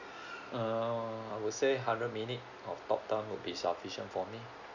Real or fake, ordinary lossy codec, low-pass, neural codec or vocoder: real; none; 7.2 kHz; none